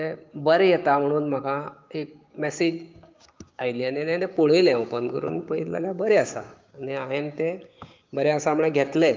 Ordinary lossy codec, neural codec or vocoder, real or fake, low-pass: Opus, 24 kbps; codec, 16 kHz, 6 kbps, DAC; fake; 7.2 kHz